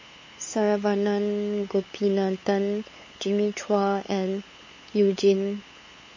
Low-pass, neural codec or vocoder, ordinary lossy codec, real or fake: 7.2 kHz; codec, 16 kHz, 8 kbps, FunCodec, trained on LibriTTS, 25 frames a second; MP3, 32 kbps; fake